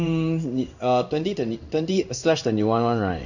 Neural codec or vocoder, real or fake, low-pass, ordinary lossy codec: codec, 16 kHz in and 24 kHz out, 1 kbps, XY-Tokenizer; fake; 7.2 kHz; none